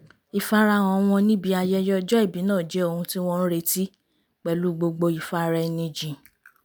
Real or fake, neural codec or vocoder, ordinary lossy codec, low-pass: real; none; none; none